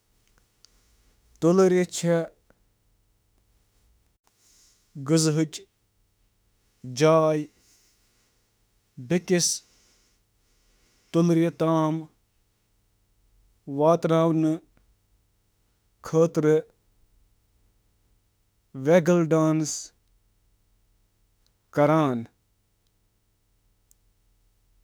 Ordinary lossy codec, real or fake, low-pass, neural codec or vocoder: none; fake; none; autoencoder, 48 kHz, 32 numbers a frame, DAC-VAE, trained on Japanese speech